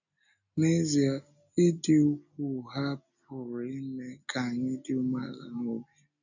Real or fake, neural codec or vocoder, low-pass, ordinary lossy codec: real; none; 7.2 kHz; none